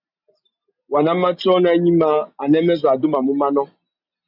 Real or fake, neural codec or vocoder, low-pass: real; none; 5.4 kHz